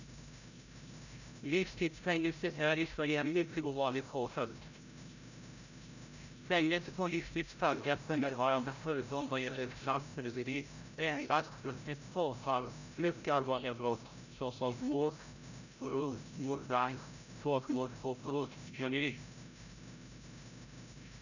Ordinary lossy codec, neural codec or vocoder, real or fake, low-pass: none; codec, 16 kHz, 0.5 kbps, FreqCodec, larger model; fake; 7.2 kHz